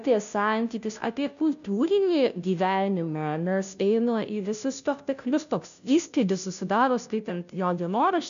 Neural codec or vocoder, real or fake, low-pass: codec, 16 kHz, 0.5 kbps, FunCodec, trained on Chinese and English, 25 frames a second; fake; 7.2 kHz